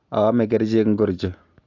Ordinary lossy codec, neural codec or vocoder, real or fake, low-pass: AAC, 48 kbps; none; real; 7.2 kHz